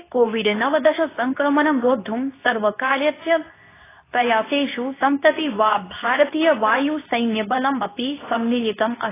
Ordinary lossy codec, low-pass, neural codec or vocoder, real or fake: AAC, 16 kbps; 3.6 kHz; codec, 24 kHz, 0.9 kbps, WavTokenizer, medium speech release version 2; fake